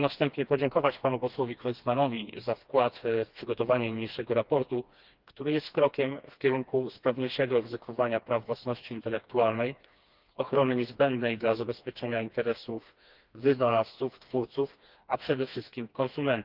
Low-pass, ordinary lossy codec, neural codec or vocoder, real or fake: 5.4 kHz; Opus, 32 kbps; codec, 16 kHz, 2 kbps, FreqCodec, smaller model; fake